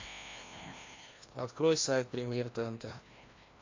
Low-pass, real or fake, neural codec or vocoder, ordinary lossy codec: 7.2 kHz; fake; codec, 16 kHz, 1 kbps, FreqCodec, larger model; AAC, 48 kbps